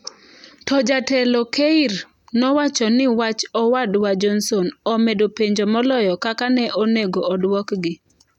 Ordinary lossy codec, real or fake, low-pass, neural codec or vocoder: none; real; 19.8 kHz; none